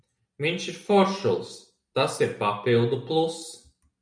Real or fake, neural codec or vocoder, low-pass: real; none; 9.9 kHz